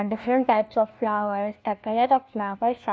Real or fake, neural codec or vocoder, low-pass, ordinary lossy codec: fake; codec, 16 kHz, 1 kbps, FunCodec, trained on LibriTTS, 50 frames a second; none; none